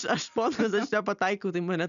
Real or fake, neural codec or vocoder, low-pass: real; none; 7.2 kHz